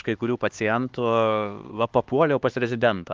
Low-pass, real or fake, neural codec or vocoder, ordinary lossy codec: 7.2 kHz; fake; codec, 16 kHz, 2 kbps, X-Codec, HuBERT features, trained on LibriSpeech; Opus, 24 kbps